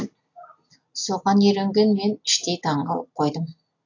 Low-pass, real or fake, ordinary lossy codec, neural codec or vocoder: 7.2 kHz; real; AAC, 48 kbps; none